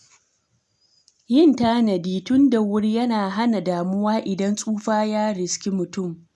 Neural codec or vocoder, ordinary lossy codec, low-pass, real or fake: none; none; none; real